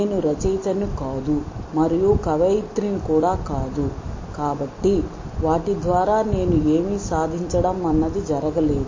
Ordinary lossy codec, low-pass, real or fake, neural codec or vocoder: MP3, 32 kbps; 7.2 kHz; real; none